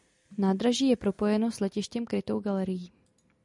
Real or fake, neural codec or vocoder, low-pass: real; none; 10.8 kHz